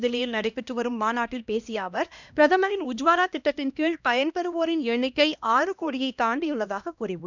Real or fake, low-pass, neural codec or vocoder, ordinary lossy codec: fake; 7.2 kHz; codec, 16 kHz, 1 kbps, X-Codec, HuBERT features, trained on LibriSpeech; none